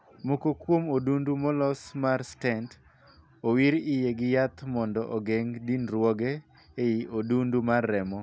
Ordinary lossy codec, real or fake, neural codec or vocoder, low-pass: none; real; none; none